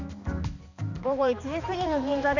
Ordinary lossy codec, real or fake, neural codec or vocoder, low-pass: none; fake; codec, 16 kHz, 2 kbps, X-Codec, HuBERT features, trained on balanced general audio; 7.2 kHz